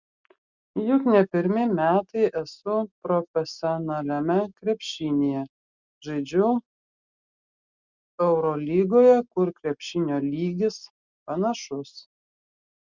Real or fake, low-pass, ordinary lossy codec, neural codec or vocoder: real; 7.2 kHz; Opus, 64 kbps; none